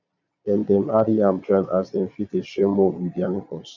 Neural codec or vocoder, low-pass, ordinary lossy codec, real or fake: vocoder, 22.05 kHz, 80 mel bands, Vocos; 7.2 kHz; none; fake